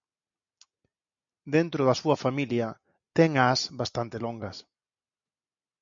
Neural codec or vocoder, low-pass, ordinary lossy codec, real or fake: none; 7.2 kHz; MP3, 64 kbps; real